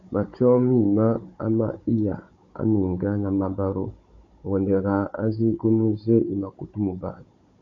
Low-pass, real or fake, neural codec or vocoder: 7.2 kHz; fake; codec, 16 kHz, 16 kbps, FunCodec, trained on Chinese and English, 50 frames a second